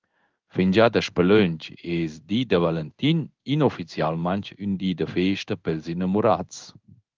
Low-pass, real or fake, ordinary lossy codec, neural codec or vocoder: 7.2 kHz; fake; Opus, 24 kbps; codec, 16 kHz in and 24 kHz out, 1 kbps, XY-Tokenizer